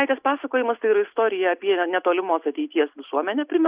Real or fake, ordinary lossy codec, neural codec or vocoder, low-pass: real; Opus, 64 kbps; none; 3.6 kHz